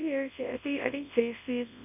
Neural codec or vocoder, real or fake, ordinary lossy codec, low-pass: codec, 24 kHz, 0.9 kbps, WavTokenizer, large speech release; fake; none; 3.6 kHz